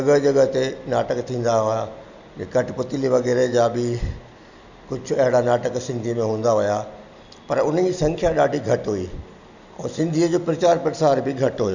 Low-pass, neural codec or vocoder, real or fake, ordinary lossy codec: 7.2 kHz; none; real; none